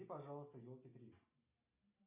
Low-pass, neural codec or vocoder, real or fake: 3.6 kHz; none; real